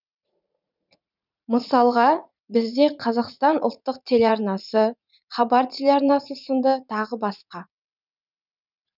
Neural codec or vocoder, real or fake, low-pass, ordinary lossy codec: vocoder, 44.1 kHz, 80 mel bands, Vocos; fake; 5.4 kHz; none